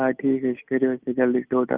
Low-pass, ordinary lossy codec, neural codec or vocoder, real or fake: 3.6 kHz; Opus, 24 kbps; none; real